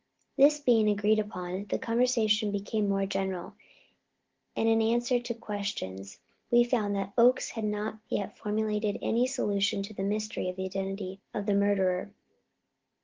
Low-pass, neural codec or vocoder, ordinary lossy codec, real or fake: 7.2 kHz; none; Opus, 16 kbps; real